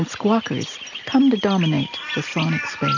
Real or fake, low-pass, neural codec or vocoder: real; 7.2 kHz; none